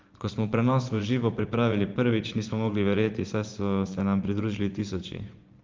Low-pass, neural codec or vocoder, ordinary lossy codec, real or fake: 7.2 kHz; vocoder, 24 kHz, 100 mel bands, Vocos; Opus, 32 kbps; fake